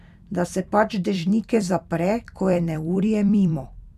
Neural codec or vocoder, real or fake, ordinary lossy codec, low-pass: vocoder, 44.1 kHz, 128 mel bands every 256 samples, BigVGAN v2; fake; none; 14.4 kHz